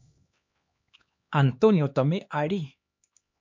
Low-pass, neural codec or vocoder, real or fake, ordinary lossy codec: 7.2 kHz; codec, 16 kHz, 2 kbps, X-Codec, HuBERT features, trained on LibriSpeech; fake; MP3, 48 kbps